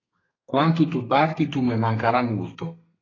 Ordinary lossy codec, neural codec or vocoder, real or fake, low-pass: AAC, 32 kbps; codec, 32 kHz, 1.9 kbps, SNAC; fake; 7.2 kHz